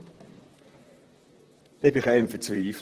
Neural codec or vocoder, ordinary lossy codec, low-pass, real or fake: vocoder, 44.1 kHz, 128 mel bands, Pupu-Vocoder; Opus, 16 kbps; 14.4 kHz; fake